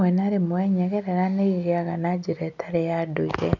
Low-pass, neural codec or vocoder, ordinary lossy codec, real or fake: 7.2 kHz; none; none; real